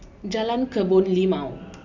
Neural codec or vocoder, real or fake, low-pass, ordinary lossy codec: none; real; 7.2 kHz; none